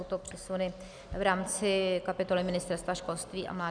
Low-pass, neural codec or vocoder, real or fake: 9.9 kHz; none; real